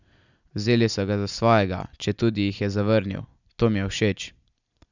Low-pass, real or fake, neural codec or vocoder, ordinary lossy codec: 7.2 kHz; real; none; none